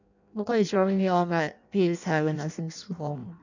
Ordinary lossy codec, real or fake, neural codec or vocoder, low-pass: none; fake; codec, 16 kHz in and 24 kHz out, 0.6 kbps, FireRedTTS-2 codec; 7.2 kHz